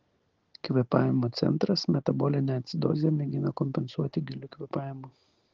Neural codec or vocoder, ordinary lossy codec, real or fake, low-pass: none; Opus, 16 kbps; real; 7.2 kHz